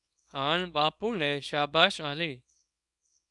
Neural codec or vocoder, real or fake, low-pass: codec, 24 kHz, 0.9 kbps, WavTokenizer, small release; fake; 10.8 kHz